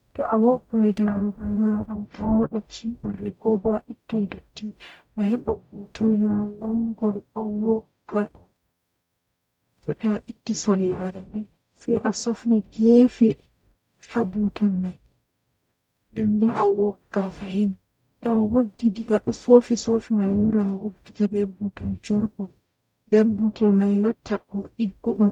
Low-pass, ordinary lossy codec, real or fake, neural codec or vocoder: 19.8 kHz; none; fake; codec, 44.1 kHz, 0.9 kbps, DAC